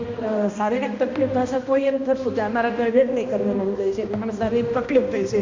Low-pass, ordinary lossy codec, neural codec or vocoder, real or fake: 7.2 kHz; MP3, 64 kbps; codec, 16 kHz, 1 kbps, X-Codec, HuBERT features, trained on balanced general audio; fake